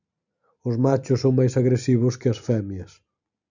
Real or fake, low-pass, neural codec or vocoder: real; 7.2 kHz; none